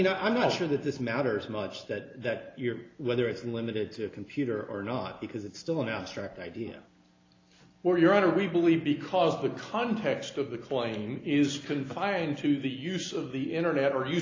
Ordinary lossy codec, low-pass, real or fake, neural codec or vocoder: AAC, 32 kbps; 7.2 kHz; real; none